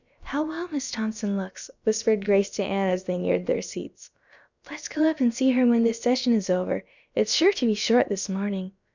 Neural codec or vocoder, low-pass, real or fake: codec, 16 kHz, about 1 kbps, DyCAST, with the encoder's durations; 7.2 kHz; fake